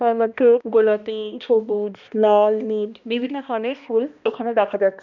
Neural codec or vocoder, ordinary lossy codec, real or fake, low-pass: codec, 16 kHz, 1 kbps, X-Codec, HuBERT features, trained on balanced general audio; none; fake; 7.2 kHz